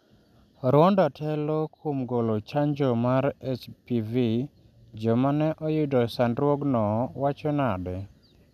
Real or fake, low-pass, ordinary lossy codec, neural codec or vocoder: real; 14.4 kHz; none; none